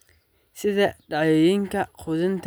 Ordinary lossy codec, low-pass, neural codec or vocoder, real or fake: none; none; none; real